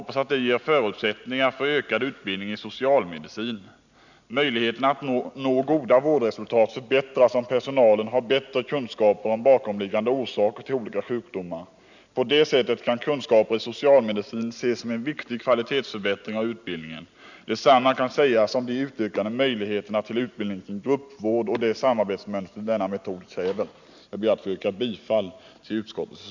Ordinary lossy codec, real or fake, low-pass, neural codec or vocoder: none; real; 7.2 kHz; none